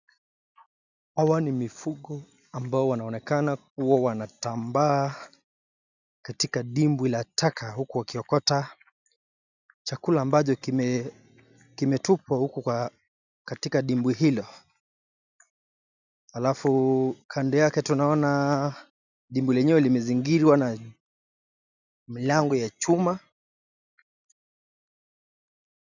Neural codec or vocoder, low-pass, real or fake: none; 7.2 kHz; real